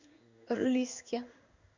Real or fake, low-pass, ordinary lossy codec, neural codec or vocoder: fake; 7.2 kHz; Opus, 64 kbps; codec, 16 kHz in and 24 kHz out, 1 kbps, XY-Tokenizer